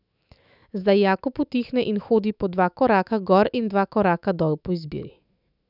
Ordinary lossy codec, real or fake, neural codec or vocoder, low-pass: none; fake; autoencoder, 48 kHz, 128 numbers a frame, DAC-VAE, trained on Japanese speech; 5.4 kHz